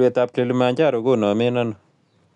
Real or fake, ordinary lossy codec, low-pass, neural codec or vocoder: real; none; 10.8 kHz; none